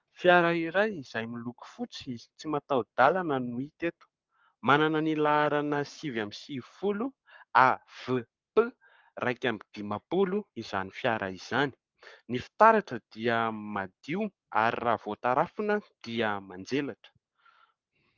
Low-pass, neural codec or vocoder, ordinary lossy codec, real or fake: 7.2 kHz; codec, 44.1 kHz, 7.8 kbps, Pupu-Codec; Opus, 24 kbps; fake